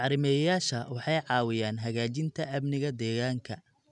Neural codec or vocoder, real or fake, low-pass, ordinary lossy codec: none; real; 10.8 kHz; none